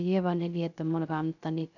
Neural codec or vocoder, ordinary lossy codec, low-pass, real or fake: codec, 16 kHz, 0.3 kbps, FocalCodec; none; 7.2 kHz; fake